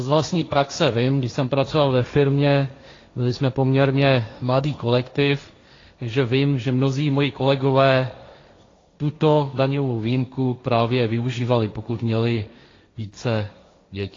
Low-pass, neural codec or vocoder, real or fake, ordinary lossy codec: 7.2 kHz; codec, 16 kHz, 1.1 kbps, Voila-Tokenizer; fake; AAC, 32 kbps